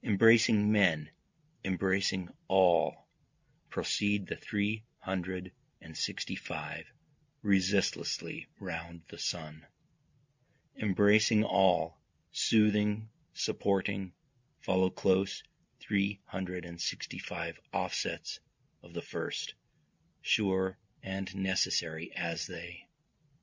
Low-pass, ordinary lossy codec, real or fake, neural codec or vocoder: 7.2 kHz; MP3, 64 kbps; real; none